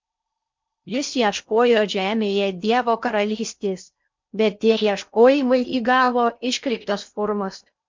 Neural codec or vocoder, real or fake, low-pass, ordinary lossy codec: codec, 16 kHz in and 24 kHz out, 0.6 kbps, FocalCodec, streaming, 4096 codes; fake; 7.2 kHz; MP3, 64 kbps